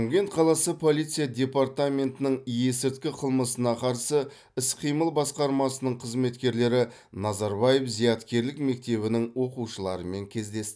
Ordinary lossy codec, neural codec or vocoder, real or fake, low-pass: none; none; real; none